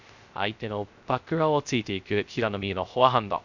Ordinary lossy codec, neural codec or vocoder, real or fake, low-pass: none; codec, 16 kHz, 0.3 kbps, FocalCodec; fake; 7.2 kHz